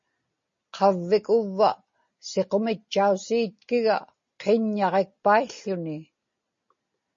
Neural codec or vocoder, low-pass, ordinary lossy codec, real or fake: none; 7.2 kHz; MP3, 32 kbps; real